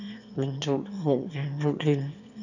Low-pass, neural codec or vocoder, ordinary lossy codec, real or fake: 7.2 kHz; autoencoder, 22.05 kHz, a latent of 192 numbers a frame, VITS, trained on one speaker; none; fake